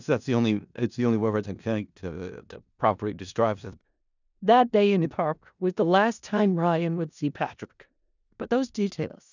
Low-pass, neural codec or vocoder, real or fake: 7.2 kHz; codec, 16 kHz in and 24 kHz out, 0.4 kbps, LongCat-Audio-Codec, four codebook decoder; fake